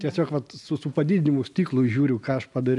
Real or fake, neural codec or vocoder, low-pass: real; none; 10.8 kHz